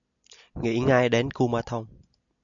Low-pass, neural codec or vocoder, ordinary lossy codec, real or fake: 7.2 kHz; none; MP3, 96 kbps; real